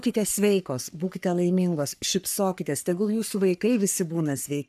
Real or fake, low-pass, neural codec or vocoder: fake; 14.4 kHz; codec, 44.1 kHz, 3.4 kbps, Pupu-Codec